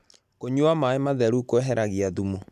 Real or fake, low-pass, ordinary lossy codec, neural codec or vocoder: real; 14.4 kHz; none; none